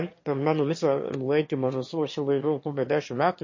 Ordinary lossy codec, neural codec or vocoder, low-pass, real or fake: MP3, 32 kbps; autoencoder, 22.05 kHz, a latent of 192 numbers a frame, VITS, trained on one speaker; 7.2 kHz; fake